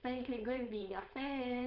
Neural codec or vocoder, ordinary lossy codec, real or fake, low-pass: codec, 16 kHz, 4.8 kbps, FACodec; none; fake; 5.4 kHz